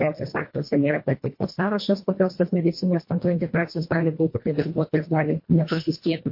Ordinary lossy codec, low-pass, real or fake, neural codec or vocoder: MP3, 32 kbps; 5.4 kHz; fake; codec, 24 kHz, 1.5 kbps, HILCodec